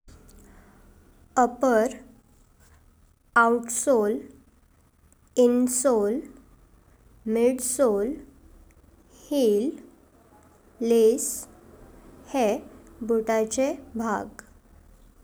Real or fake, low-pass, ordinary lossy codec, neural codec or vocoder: real; none; none; none